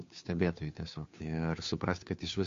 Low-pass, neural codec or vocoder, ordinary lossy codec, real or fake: 7.2 kHz; codec, 16 kHz, 2 kbps, FunCodec, trained on Chinese and English, 25 frames a second; MP3, 48 kbps; fake